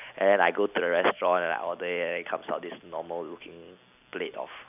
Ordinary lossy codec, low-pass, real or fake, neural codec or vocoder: none; 3.6 kHz; real; none